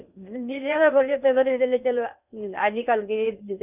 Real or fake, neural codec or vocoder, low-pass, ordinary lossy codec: fake; codec, 16 kHz in and 24 kHz out, 0.6 kbps, FocalCodec, streaming, 2048 codes; 3.6 kHz; none